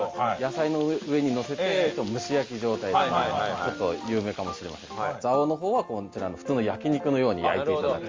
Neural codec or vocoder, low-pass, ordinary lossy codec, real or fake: none; 7.2 kHz; Opus, 32 kbps; real